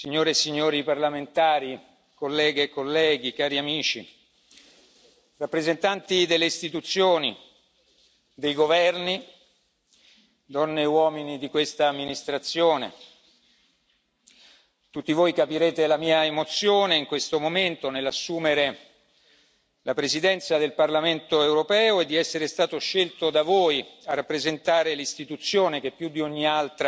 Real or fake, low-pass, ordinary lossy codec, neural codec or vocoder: real; none; none; none